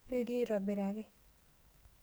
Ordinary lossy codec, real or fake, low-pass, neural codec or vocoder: none; fake; none; codec, 44.1 kHz, 2.6 kbps, SNAC